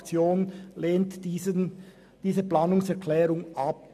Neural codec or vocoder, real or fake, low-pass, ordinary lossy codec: none; real; 14.4 kHz; MP3, 96 kbps